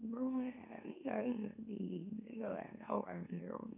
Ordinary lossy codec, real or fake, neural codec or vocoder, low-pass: none; fake; autoencoder, 44.1 kHz, a latent of 192 numbers a frame, MeloTTS; 3.6 kHz